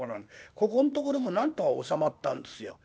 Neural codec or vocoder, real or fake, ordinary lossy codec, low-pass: codec, 16 kHz, 0.8 kbps, ZipCodec; fake; none; none